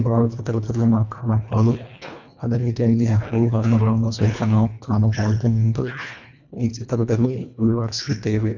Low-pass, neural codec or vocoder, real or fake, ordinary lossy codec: 7.2 kHz; codec, 24 kHz, 1.5 kbps, HILCodec; fake; none